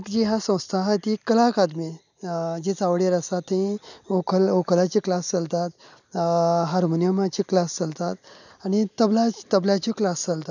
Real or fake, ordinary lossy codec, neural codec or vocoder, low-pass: real; none; none; 7.2 kHz